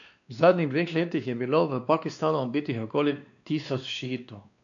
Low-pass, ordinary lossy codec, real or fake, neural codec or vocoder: 7.2 kHz; none; fake; codec, 16 kHz, 2 kbps, X-Codec, WavLM features, trained on Multilingual LibriSpeech